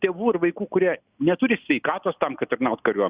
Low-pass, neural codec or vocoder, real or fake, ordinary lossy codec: 3.6 kHz; none; real; Opus, 64 kbps